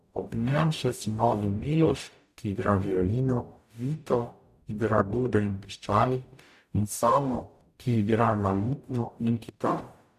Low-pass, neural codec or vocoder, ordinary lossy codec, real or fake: 14.4 kHz; codec, 44.1 kHz, 0.9 kbps, DAC; none; fake